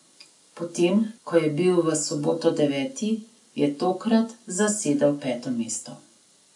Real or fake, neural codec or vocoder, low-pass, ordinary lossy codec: real; none; 10.8 kHz; none